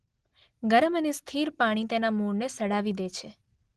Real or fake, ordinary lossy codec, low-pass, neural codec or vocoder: real; Opus, 16 kbps; 10.8 kHz; none